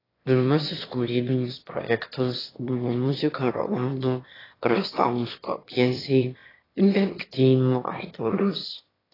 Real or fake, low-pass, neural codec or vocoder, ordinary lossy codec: fake; 5.4 kHz; autoencoder, 22.05 kHz, a latent of 192 numbers a frame, VITS, trained on one speaker; AAC, 24 kbps